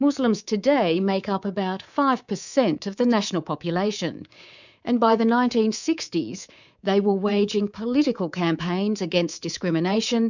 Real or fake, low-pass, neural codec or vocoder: fake; 7.2 kHz; codec, 24 kHz, 3.1 kbps, DualCodec